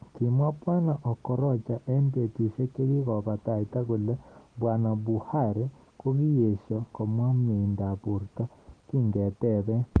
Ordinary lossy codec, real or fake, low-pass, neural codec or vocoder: Opus, 16 kbps; real; 9.9 kHz; none